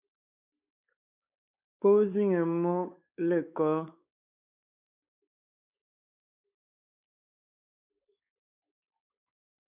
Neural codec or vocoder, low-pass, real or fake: codec, 16 kHz, 4 kbps, X-Codec, WavLM features, trained on Multilingual LibriSpeech; 3.6 kHz; fake